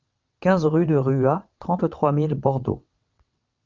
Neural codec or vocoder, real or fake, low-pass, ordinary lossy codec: vocoder, 22.05 kHz, 80 mel bands, WaveNeXt; fake; 7.2 kHz; Opus, 24 kbps